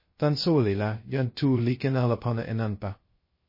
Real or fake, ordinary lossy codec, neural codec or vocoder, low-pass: fake; MP3, 24 kbps; codec, 16 kHz, 0.2 kbps, FocalCodec; 5.4 kHz